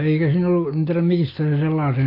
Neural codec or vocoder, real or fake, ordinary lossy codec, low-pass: none; real; AAC, 24 kbps; 5.4 kHz